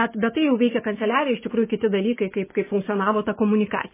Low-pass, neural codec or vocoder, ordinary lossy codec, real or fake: 3.6 kHz; none; MP3, 16 kbps; real